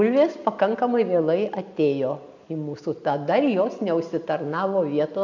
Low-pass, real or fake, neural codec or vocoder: 7.2 kHz; real; none